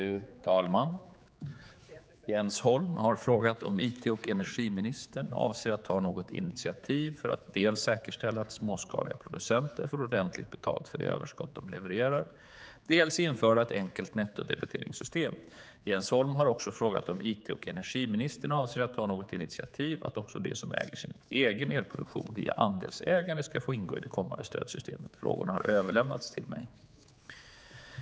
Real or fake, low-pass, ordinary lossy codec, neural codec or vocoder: fake; none; none; codec, 16 kHz, 4 kbps, X-Codec, HuBERT features, trained on general audio